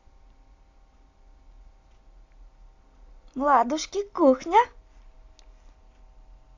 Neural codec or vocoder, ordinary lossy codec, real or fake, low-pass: none; AAC, 48 kbps; real; 7.2 kHz